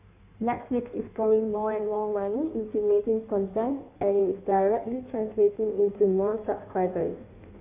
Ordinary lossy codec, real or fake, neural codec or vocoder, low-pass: none; fake; codec, 16 kHz in and 24 kHz out, 1.1 kbps, FireRedTTS-2 codec; 3.6 kHz